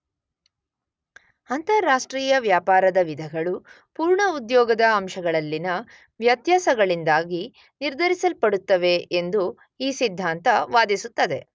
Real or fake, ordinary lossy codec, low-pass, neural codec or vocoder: real; none; none; none